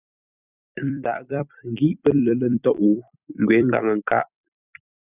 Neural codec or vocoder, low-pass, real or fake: vocoder, 44.1 kHz, 128 mel bands every 256 samples, BigVGAN v2; 3.6 kHz; fake